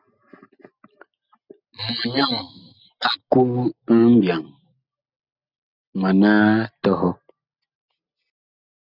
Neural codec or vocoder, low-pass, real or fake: none; 5.4 kHz; real